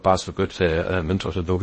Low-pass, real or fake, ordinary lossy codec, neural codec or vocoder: 10.8 kHz; fake; MP3, 32 kbps; codec, 16 kHz in and 24 kHz out, 0.6 kbps, FocalCodec, streaming, 2048 codes